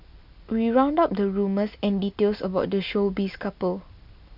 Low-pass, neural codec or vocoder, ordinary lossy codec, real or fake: 5.4 kHz; none; none; real